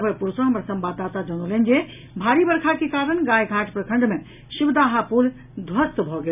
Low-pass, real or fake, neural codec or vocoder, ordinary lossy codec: 3.6 kHz; real; none; none